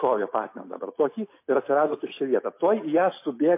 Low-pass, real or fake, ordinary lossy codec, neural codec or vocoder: 3.6 kHz; real; MP3, 24 kbps; none